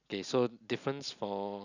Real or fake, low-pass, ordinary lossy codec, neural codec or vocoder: real; 7.2 kHz; none; none